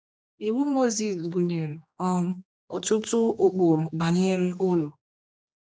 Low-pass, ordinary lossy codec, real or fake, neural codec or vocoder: none; none; fake; codec, 16 kHz, 2 kbps, X-Codec, HuBERT features, trained on general audio